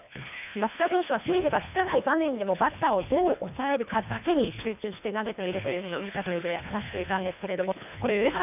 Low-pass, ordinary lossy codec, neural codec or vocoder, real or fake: 3.6 kHz; none; codec, 24 kHz, 1.5 kbps, HILCodec; fake